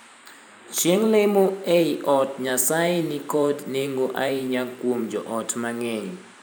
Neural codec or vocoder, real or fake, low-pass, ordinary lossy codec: vocoder, 44.1 kHz, 128 mel bands every 512 samples, BigVGAN v2; fake; none; none